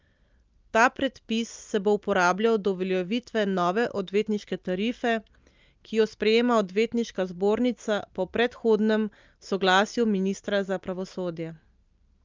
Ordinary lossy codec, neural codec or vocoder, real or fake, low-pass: Opus, 24 kbps; none; real; 7.2 kHz